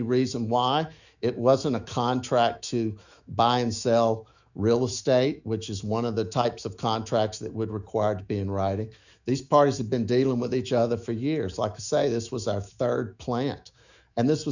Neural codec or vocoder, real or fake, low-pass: autoencoder, 48 kHz, 128 numbers a frame, DAC-VAE, trained on Japanese speech; fake; 7.2 kHz